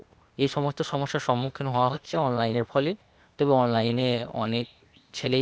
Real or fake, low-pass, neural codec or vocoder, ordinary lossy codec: fake; none; codec, 16 kHz, 0.8 kbps, ZipCodec; none